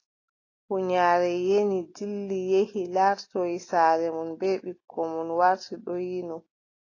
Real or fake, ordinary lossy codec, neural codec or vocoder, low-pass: real; AAC, 32 kbps; none; 7.2 kHz